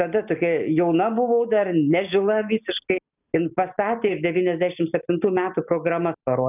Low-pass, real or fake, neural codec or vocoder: 3.6 kHz; real; none